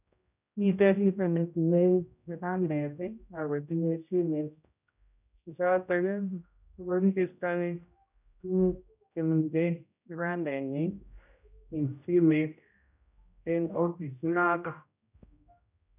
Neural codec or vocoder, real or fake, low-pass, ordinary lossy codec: codec, 16 kHz, 0.5 kbps, X-Codec, HuBERT features, trained on general audio; fake; 3.6 kHz; none